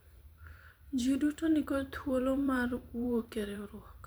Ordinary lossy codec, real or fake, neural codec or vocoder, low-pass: none; fake; vocoder, 44.1 kHz, 128 mel bands every 512 samples, BigVGAN v2; none